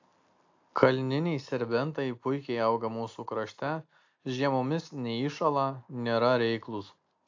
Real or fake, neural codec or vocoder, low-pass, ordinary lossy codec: real; none; 7.2 kHz; AAC, 48 kbps